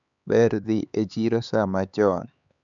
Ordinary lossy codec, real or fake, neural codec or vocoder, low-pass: none; fake; codec, 16 kHz, 4 kbps, X-Codec, HuBERT features, trained on LibriSpeech; 7.2 kHz